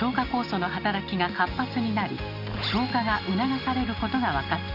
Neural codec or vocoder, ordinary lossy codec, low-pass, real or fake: none; none; 5.4 kHz; real